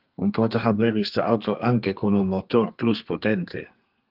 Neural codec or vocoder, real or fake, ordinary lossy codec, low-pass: codec, 32 kHz, 1.9 kbps, SNAC; fake; Opus, 24 kbps; 5.4 kHz